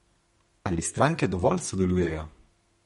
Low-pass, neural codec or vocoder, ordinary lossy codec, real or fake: 14.4 kHz; codec, 32 kHz, 1.9 kbps, SNAC; MP3, 48 kbps; fake